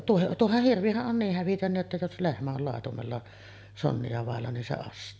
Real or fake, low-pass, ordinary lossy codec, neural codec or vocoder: real; none; none; none